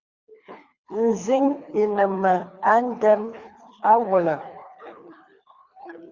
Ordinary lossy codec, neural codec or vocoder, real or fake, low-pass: Opus, 64 kbps; codec, 24 kHz, 3 kbps, HILCodec; fake; 7.2 kHz